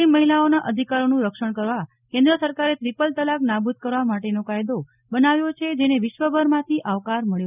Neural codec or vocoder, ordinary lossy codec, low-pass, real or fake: none; none; 3.6 kHz; real